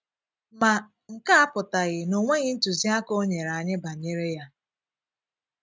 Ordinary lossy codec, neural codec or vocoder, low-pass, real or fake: none; none; none; real